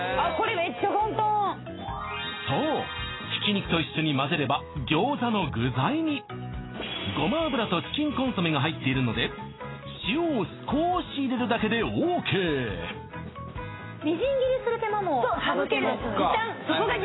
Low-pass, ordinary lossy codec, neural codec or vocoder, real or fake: 7.2 kHz; AAC, 16 kbps; none; real